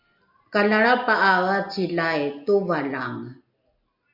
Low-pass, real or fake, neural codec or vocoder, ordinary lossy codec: 5.4 kHz; real; none; AAC, 48 kbps